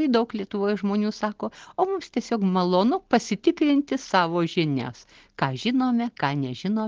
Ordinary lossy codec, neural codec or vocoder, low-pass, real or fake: Opus, 16 kbps; none; 7.2 kHz; real